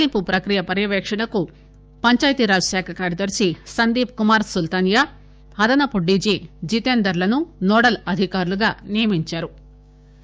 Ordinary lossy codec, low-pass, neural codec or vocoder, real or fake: none; none; codec, 16 kHz, 6 kbps, DAC; fake